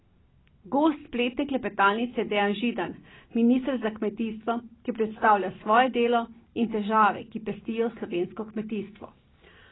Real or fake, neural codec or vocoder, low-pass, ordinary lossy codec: real; none; 7.2 kHz; AAC, 16 kbps